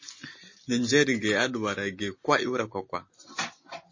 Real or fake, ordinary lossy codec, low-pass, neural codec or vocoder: real; MP3, 32 kbps; 7.2 kHz; none